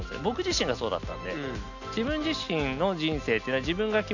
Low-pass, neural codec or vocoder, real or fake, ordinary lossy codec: 7.2 kHz; none; real; none